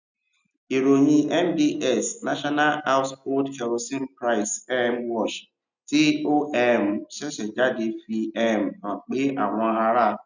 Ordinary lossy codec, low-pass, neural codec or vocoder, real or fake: none; 7.2 kHz; none; real